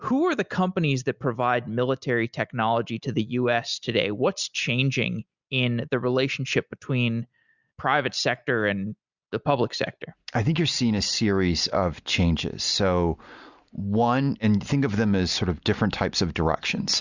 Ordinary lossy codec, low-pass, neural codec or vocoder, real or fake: Opus, 64 kbps; 7.2 kHz; none; real